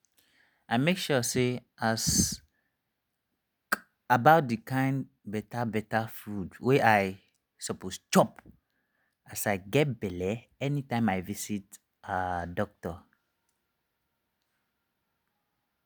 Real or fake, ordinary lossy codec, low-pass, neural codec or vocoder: real; none; none; none